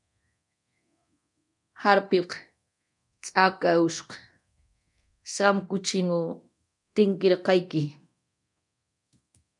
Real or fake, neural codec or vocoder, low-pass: fake; codec, 24 kHz, 0.9 kbps, DualCodec; 10.8 kHz